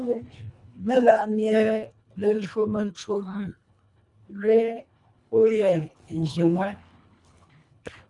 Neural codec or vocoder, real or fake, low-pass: codec, 24 kHz, 1.5 kbps, HILCodec; fake; 10.8 kHz